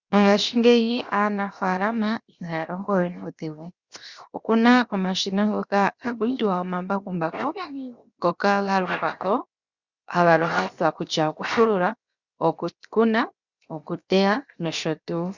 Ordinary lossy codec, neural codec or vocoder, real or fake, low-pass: Opus, 64 kbps; codec, 16 kHz, 0.7 kbps, FocalCodec; fake; 7.2 kHz